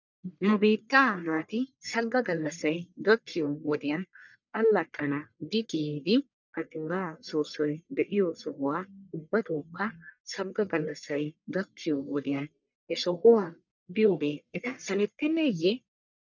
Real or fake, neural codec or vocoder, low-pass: fake; codec, 44.1 kHz, 1.7 kbps, Pupu-Codec; 7.2 kHz